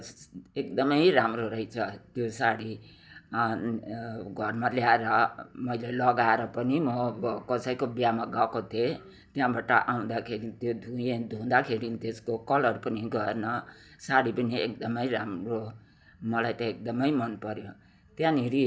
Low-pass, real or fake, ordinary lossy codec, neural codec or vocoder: none; real; none; none